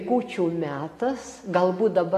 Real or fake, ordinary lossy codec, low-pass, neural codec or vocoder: real; AAC, 48 kbps; 14.4 kHz; none